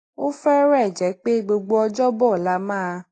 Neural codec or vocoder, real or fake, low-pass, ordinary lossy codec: none; real; 9.9 kHz; AAC, 32 kbps